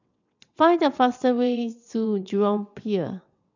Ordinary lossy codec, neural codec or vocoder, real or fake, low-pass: none; vocoder, 22.05 kHz, 80 mel bands, WaveNeXt; fake; 7.2 kHz